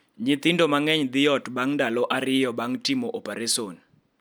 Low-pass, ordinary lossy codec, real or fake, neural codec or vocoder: none; none; real; none